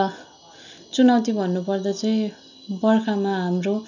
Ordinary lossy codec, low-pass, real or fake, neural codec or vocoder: none; 7.2 kHz; real; none